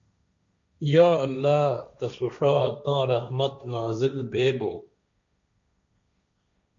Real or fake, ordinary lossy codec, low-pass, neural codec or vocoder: fake; MP3, 64 kbps; 7.2 kHz; codec, 16 kHz, 1.1 kbps, Voila-Tokenizer